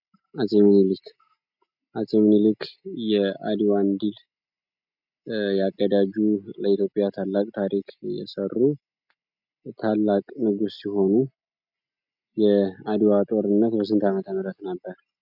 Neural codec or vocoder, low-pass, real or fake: none; 5.4 kHz; real